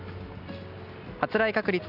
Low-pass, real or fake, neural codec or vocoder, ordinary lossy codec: 5.4 kHz; real; none; none